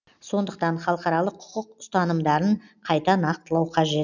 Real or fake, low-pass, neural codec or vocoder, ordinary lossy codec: real; 7.2 kHz; none; none